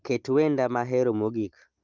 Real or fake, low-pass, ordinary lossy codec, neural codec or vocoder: real; 7.2 kHz; Opus, 32 kbps; none